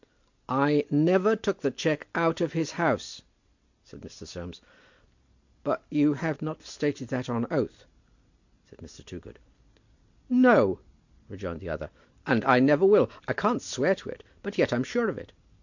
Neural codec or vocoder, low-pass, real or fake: none; 7.2 kHz; real